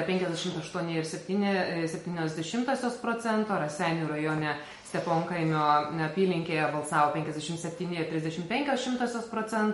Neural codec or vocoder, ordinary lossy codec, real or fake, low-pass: none; MP3, 48 kbps; real; 19.8 kHz